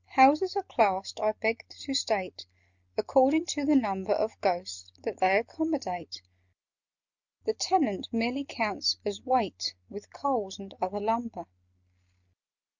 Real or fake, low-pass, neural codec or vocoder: real; 7.2 kHz; none